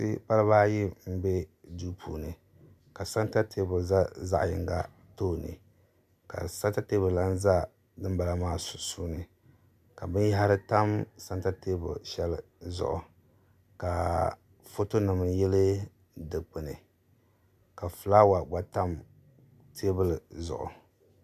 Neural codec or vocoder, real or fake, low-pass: none; real; 14.4 kHz